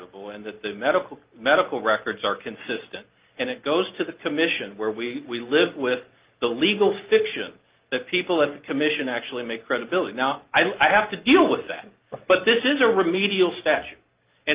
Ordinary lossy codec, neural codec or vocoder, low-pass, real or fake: Opus, 32 kbps; none; 3.6 kHz; real